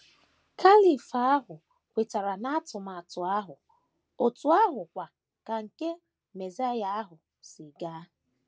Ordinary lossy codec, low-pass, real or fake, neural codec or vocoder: none; none; real; none